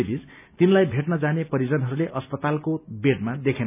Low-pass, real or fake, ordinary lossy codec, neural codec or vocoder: 3.6 kHz; real; none; none